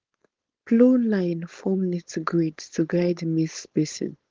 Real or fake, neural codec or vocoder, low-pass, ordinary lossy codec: fake; codec, 16 kHz, 4.8 kbps, FACodec; 7.2 kHz; Opus, 16 kbps